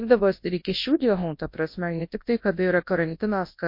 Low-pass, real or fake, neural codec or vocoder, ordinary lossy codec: 5.4 kHz; fake; codec, 24 kHz, 0.9 kbps, WavTokenizer, large speech release; MP3, 32 kbps